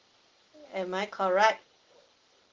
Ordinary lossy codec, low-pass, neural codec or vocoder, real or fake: Opus, 16 kbps; 7.2 kHz; none; real